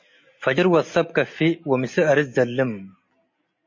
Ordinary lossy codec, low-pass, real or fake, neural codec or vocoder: MP3, 32 kbps; 7.2 kHz; real; none